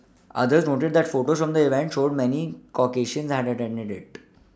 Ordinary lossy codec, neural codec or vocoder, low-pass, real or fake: none; none; none; real